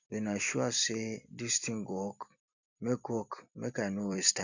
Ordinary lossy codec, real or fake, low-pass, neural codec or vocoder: none; fake; 7.2 kHz; vocoder, 44.1 kHz, 128 mel bands every 256 samples, BigVGAN v2